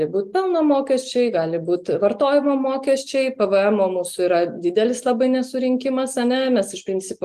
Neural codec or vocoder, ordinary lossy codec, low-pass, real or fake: none; Opus, 24 kbps; 14.4 kHz; real